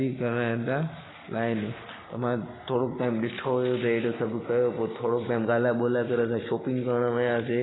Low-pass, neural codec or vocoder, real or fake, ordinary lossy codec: 7.2 kHz; none; real; AAC, 16 kbps